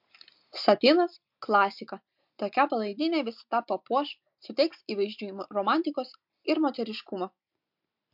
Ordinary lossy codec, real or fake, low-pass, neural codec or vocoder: MP3, 48 kbps; real; 5.4 kHz; none